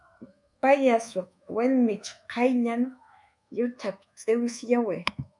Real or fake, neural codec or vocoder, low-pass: fake; codec, 24 kHz, 1.2 kbps, DualCodec; 10.8 kHz